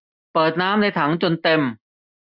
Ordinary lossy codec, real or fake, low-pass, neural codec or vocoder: none; real; 5.4 kHz; none